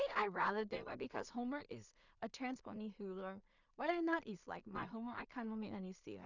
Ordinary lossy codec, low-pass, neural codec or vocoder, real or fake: none; 7.2 kHz; codec, 16 kHz in and 24 kHz out, 0.4 kbps, LongCat-Audio-Codec, two codebook decoder; fake